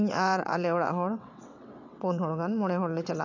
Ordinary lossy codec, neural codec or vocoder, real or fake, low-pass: none; vocoder, 22.05 kHz, 80 mel bands, WaveNeXt; fake; 7.2 kHz